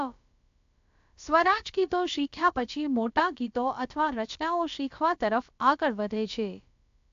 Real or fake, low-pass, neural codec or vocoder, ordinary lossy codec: fake; 7.2 kHz; codec, 16 kHz, about 1 kbps, DyCAST, with the encoder's durations; AAC, 64 kbps